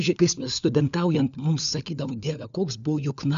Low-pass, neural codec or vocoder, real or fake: 7.2 kHz; codec, 16 kHz, 4 kbps, FunCodec, trained on LibriTTS, 50 frames a second; fake